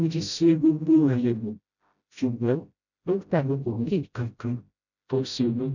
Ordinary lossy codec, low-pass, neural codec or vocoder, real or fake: none; 7.2 kHz; codec, 16 kHz, 0.5 kbps, FreqCodec, smaller model; fake